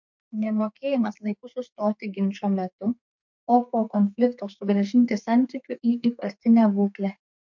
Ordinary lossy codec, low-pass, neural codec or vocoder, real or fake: MP3, 48 kbps; 7.2 kHz; codec, 32 kHz, 1.9 kbps, SNAC; fake